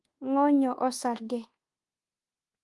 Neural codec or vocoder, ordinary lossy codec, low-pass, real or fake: codec, 24 kHz, 1.2 kbps, DualCodec; Opus, 24 kbps; 10.8 kHz; fake